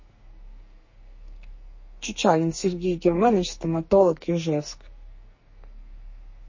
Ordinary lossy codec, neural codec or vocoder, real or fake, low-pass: MP3, 32 kbps; codec, 44.1 kHz, 2.6 kbps, SNAC; fake; 7.2 kHz